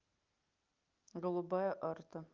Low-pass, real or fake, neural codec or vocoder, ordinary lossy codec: 7.2 kHz; real; none; Opus, 24 kbps